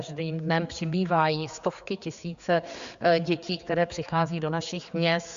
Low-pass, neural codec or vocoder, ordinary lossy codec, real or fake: 7.2 kHz; codec, 16 kHz, 4 kbps, X-Codec, HuBERT features, trained on general audio; Opus, 64 kbps; fake